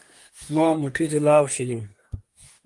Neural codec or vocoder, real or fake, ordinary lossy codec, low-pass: codec, 24 kHz, 1 kbps, SNAC; fake; Opus, 32 kbps; 10.8 kHz